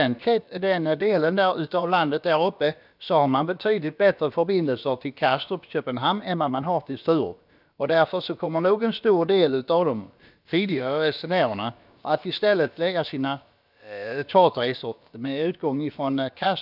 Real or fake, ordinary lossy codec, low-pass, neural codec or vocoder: fake; none; 5.4 kHz; codec, 16 kHz, about 1 kbps, DyCAST, with the encoder's durations